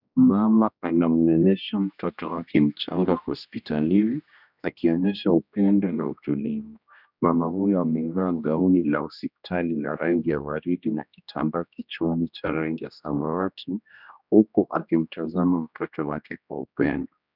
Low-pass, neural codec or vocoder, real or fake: 5.4 kHz; codec, 16 kHz, 1 kbps, X-Codec, HuBERT features, trained on general audio; fake